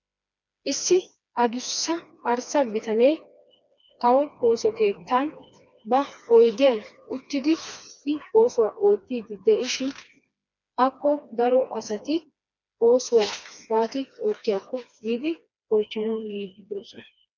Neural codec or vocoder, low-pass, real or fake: codec, 16 kHz, 2 kbps, FreqCodec, smaller model; 7.2 kHz; fake